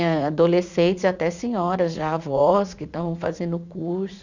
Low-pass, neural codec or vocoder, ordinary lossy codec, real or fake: 7.2 kHz; vocoder, 44.1 kHz, 80 mel bands, Vocos; none; fake